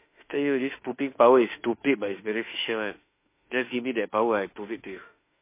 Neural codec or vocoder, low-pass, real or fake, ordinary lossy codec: autoencoder, 48 kHz, 32 numbers a frame, DAC-VAE, trained on Japanese speech; 3.6 kHz; fake; MP3, 24 kbps